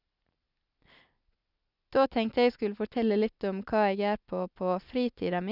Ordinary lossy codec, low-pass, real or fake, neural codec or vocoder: none; 5.4 kHz; real; none